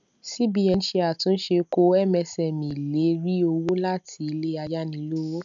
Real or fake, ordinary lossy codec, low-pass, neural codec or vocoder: real; none; 7.2 kHz; none